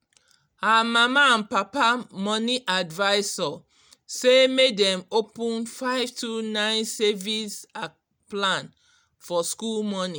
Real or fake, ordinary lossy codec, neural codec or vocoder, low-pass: real; none; none; none